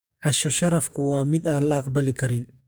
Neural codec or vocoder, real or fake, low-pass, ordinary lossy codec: codec, 44.1 kHz, 2.6 kbps, DAC; fake; none; none